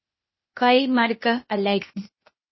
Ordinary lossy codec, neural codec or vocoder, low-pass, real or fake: MP3, 24 kbps; codec, 16 kHz, 0.8 kbps, ZipCodec; 7.2 kHz; fake